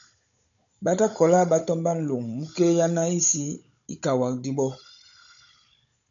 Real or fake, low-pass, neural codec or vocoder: fake; 7.2 kHz; codec, 16 kHz, 16 kbps, FunCodec, trained on Chinese and English, 50 frames a second